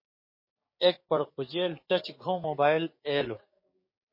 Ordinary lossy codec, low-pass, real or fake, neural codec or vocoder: MP3, 24 kbps; 5.4 kHz; fake; codec, 16 kHz, 6 kbps, DAC